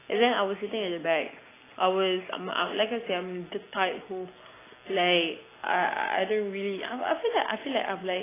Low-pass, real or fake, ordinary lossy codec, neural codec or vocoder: 3.6 kHz; real; AAC, 16 kbps; none